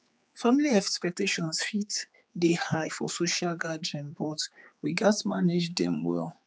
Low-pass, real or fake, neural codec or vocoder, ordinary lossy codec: none; fake; codec, 16 kHz, 4 kbps, X-Codec, HuBERT features, trained on general audio; none